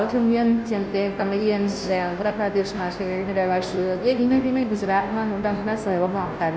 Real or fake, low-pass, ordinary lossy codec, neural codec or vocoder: fake; none; none; codec, 16 kHz, 0.5 kbps, FunCodec, trained on Chinese and English, 25 frames a second